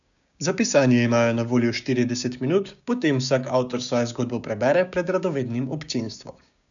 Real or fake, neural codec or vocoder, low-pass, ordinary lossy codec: fake; codec, 16 kHz, 6 kbps, DAC; 7.2 kHz; none